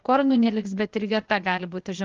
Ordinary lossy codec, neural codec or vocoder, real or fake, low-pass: Opus, 32 kbps; codec, 16 kHz, 0.8 kbps, ZipCodec; fake; 7.2 kHz